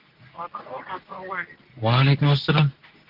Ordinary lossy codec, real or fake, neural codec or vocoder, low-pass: Opus, 16 kbps; fake; codec, 24 kHz, 0.9 kbps, WavTokenizer, medium speech release version 2; 5.4 kHz